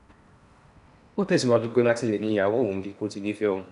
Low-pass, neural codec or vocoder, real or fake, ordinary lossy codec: 10.8 kHz; codec, 16 kHz in and 24 kHz out, 0.8 kbps, FocalCodec, streaming, 65536 codes; fake; none